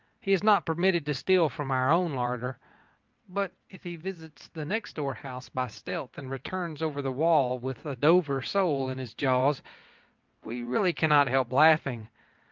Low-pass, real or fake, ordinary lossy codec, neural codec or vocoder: 7.2 kHz; fake; Opus, 24 kbps; vocoder, 22.05 kHz, 80 mel bands, WaveNeXt